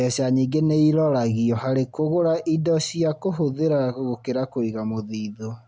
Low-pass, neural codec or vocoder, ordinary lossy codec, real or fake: none; none; none; real